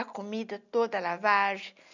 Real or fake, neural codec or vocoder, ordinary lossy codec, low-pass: real; none; none; 7.2 kHz